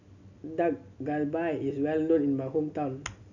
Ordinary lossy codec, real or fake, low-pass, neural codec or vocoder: none; real; 7.2 kHz; none